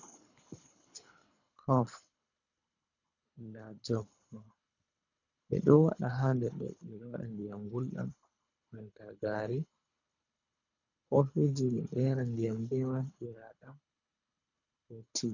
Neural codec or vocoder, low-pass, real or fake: codec, 24 kHz, 3 kbps, HILCodec; 7.2 kHz; fake